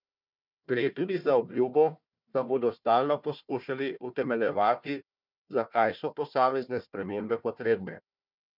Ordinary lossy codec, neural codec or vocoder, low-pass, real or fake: none; codec, 16 kHz, 1 kbps, FunCodec, trained on Chinese and English, 50 frames a second; 5.4 kHz; fake